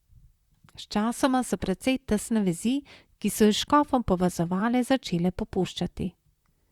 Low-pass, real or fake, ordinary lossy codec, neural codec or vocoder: 19.8 kHz; fake; Opus, 64 kbps; vocoder, 44.1 kHz, 128 mel bands, Pupu-Vocoder